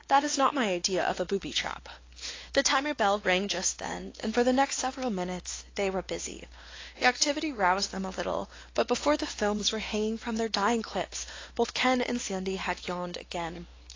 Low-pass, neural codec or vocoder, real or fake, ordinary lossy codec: 7.2 kHz; codec, 16 kHz, 2 kbps, X-Codec, WavLM features, trained on Multilingual LibriSpeech; fake; AAC, 32 kbps